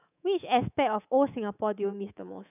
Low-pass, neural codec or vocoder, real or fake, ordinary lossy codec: 3.6 kHz; codec, 24 kHz, 3.1 kbps, DualCodec; fake; none